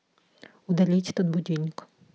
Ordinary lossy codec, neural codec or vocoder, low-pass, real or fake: none; none; none; real